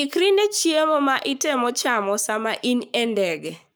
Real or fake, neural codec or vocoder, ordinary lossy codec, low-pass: fake; codec, 44.1 kHz, 7.8 kbps, Pupu-Codec; none; none